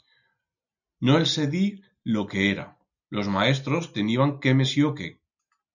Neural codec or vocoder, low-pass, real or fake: none; 7.2 kHz; real